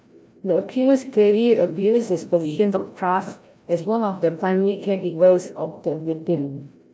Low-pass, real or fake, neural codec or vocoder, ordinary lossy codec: none; fake; codec, 16 kHz, 0.5 kbps, FreqCodec, larger model; none